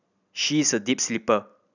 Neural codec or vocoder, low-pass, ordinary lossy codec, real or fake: none; 7.2 kHz; none; real